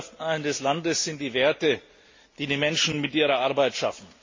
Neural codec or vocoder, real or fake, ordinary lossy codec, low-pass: none; real; MP3, 32 kbps; 7.2 kHz